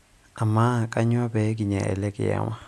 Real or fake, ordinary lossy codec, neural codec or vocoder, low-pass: real; none; none; none